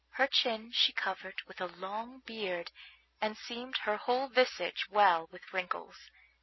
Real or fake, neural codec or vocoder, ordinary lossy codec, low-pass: real; none; MP3, 24 kbps; 7.2 kHz